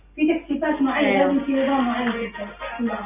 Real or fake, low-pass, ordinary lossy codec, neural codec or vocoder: real; 3.6 kHz; AAC, 32 kbps; none